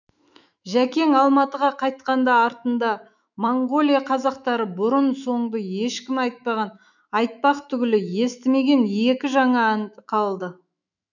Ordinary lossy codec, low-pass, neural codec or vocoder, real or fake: none; 7.2 kHz; autoencoder, 48 kHz, 128 numbers a frame, DAC-VAE, trained on Japanese speech; fake